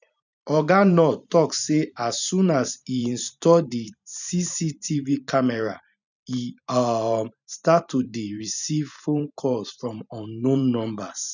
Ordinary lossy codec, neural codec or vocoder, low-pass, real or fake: none; vocoder, 44.1 kHz, 128 mel bands every 512 samples, BigVGAN v2; 7.2 kHz; fake